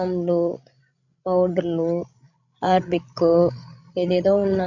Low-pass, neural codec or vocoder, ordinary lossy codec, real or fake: 7.2 kHz; codec, 16 kHz, 16 kbps, FreqCodec, larger model; none; fake